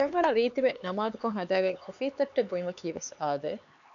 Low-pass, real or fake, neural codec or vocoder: 7.2 kHz; fake; codec, 16 kHz, 4 kbps, X-Codec, HuBERT features, trained on LibriSpeech